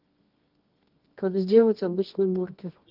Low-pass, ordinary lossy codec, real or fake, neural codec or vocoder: 5.4 kHz; Opus, 24 kbps; fake; codec, 24 kHz, 0.9 kbps, WavTokenizer, medium music audio release